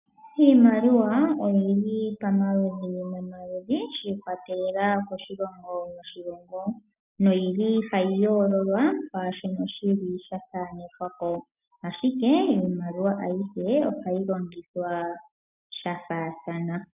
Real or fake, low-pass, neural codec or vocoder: real; 3.6 kHz; none